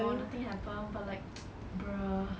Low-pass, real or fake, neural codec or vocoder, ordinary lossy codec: none; real; none; none